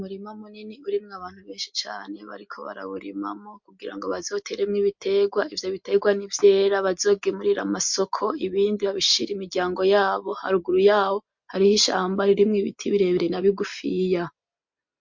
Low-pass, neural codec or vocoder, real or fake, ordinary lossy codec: 7.2 kHz; none; real; MP3, 48 kbps